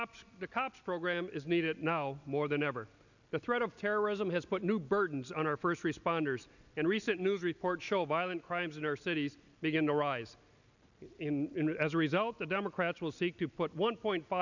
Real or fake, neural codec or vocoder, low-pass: real; none; 7.2 kHz